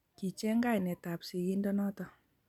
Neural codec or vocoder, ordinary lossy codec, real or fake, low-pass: none; none; real; 19.8 kHz